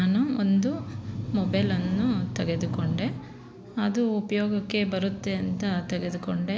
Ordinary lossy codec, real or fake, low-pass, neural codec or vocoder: none; real; none; none